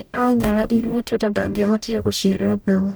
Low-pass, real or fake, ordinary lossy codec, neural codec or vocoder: none; fake; none; codec, 44.1 kHz, 0.9 kbps, DAC